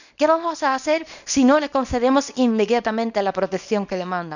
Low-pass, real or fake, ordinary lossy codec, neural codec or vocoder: 7.2 kHz; fake; none; codec, 24 kHz, 0.9 kbps, WavTokenizer, small release